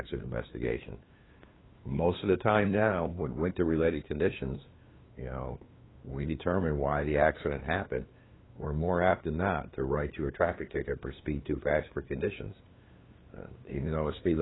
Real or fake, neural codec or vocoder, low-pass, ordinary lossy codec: fake; codec, 16 kHz, 2 kbps, FunCodec, trained on LibriTTS, 25 frames a second; 7.2 kHz; AAC, 16 kbps